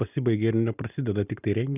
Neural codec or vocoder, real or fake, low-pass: none; real; 3.6 kHz